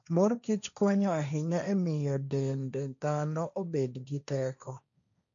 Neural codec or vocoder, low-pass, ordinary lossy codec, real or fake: codec, 16 kHz, 1.1 kbps, Voila-Tokenizer; 7.2 kHz; none; fake